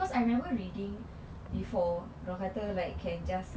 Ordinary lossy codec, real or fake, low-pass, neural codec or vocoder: none; real; none; none